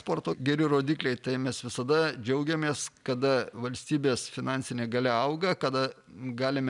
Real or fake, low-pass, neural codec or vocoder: real; 10.8 kHz; none